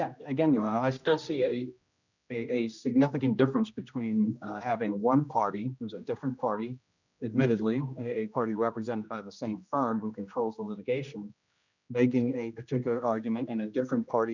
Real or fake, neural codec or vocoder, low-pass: fake; codec, 16 kHz, 1 kbps, X-Codec, HuBERT features, trained on general audio; 7.2 kHz